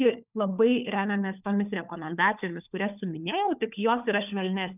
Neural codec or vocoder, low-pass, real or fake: codec, 16 kHz, 4 kbps, FunCodec, trained on LibriTTS, 50 frames a second; 3.6 kHz; fake